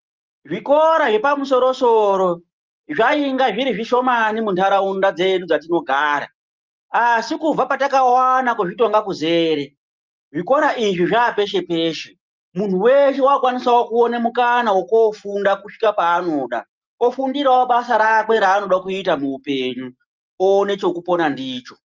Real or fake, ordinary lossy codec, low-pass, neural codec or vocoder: real; Opus, 24 kbps; 7.2 kHz; none